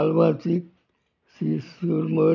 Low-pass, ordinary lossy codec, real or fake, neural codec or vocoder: 7.2 kHz; none; real; none